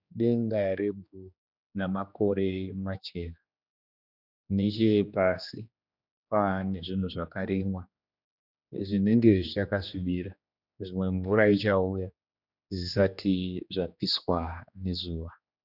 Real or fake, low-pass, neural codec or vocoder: fake; 5.4 kHz; codec, 16 kHz, 2 kbps, X-Codec, HuBERT features, trained on general audio